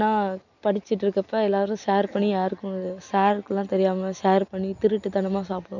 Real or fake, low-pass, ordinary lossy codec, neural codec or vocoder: real; 7.2 kHz; none; none